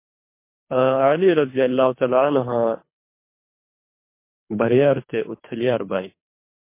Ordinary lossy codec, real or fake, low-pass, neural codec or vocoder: MP3, 24 kbps; fake; 3.6 kHz; codec, 24 kHz, 3 kbps, HILCodec